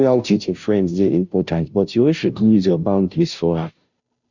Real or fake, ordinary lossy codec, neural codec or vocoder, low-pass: fake; none; codec, 16 kHz, 0.5 kbps, FunCodec, trained on Chinese and English, 25 frames a second; 7.2 kHz